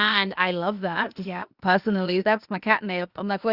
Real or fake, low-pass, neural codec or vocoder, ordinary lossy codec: fake; 5.4 kHz; codec, 16 kHz, 0.8 kbps, ZipCodec; Opus, 64 kbps